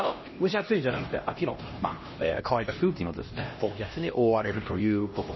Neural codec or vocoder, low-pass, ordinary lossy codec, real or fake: codec, 16 kHz, 1 kbps, X-Codec, HuBERT features, trained on LibriSpeech; 7.2 kHz; MP3, 24 kbps; fake